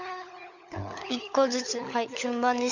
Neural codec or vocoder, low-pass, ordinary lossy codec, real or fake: codec, 16 kHz, 16 kbps, FunCodec, trained on LibriTTS, 50 frames a second; 7.2 kHz; none; fake